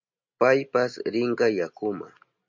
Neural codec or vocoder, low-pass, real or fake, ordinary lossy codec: none; 7.2 kHz; real; MP3, 48 kbps